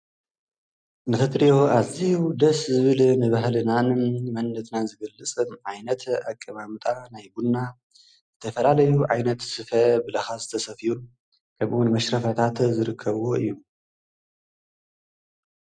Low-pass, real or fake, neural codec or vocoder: 9.9 kHz; real; none